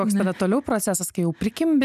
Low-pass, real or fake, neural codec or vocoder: 14.4 kHz; real; none